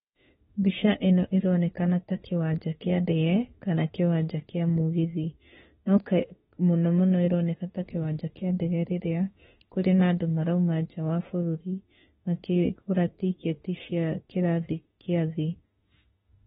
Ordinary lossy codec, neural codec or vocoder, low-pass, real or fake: AAC, 16 kbps; autoencoder, 48 kHz, 32 numbers a frame, DAC-VAE, trained on Japanese speech; 19.8 kHz; fake